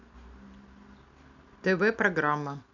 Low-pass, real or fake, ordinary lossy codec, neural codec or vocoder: 7.2 kHz; real; none; none